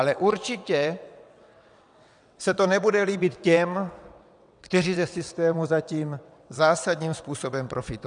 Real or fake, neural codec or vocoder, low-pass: fake; vocoder, 22.05 kHz, 80 mel bands, WaveNeXt; 9.9 kHz